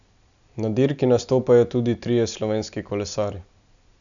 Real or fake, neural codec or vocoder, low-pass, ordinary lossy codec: real; none; 7.2 kHz; none